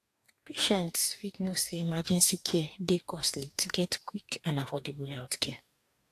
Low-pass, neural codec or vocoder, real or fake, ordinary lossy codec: 14.4 kHz; codec, 44.1 kHz, 2.6 kbps, DAC; fake; AAC, 64 kbps